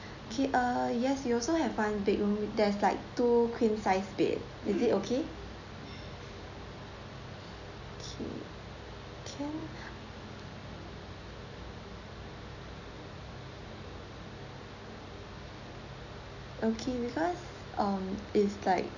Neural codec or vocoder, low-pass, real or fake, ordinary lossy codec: none; 7.2 kHz; real; none